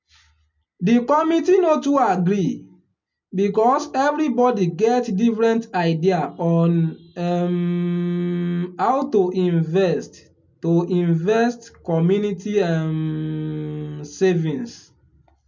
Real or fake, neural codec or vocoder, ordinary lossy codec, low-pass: real; none; MP3, 64 kbps; 7.2 kHz